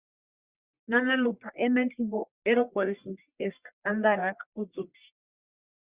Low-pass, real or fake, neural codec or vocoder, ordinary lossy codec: 3.6 kHz; fake; codec, 44.1 kHz, 1.7 kbps, Pupu-Codec; Opus, 64 kbps